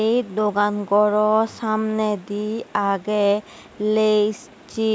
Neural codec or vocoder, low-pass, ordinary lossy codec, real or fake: none; none; none; real